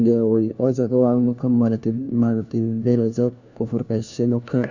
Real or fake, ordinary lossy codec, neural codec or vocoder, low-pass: fake; none; codec, 16 kHz, 1 kbps, FunCodec, trained on LibriTTS, 50 frames a second; 7.2 kHz